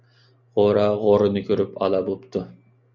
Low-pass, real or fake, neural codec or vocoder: 7.2 kHz; real; none